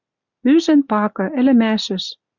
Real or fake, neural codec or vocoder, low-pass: real; none; 7.2 kHz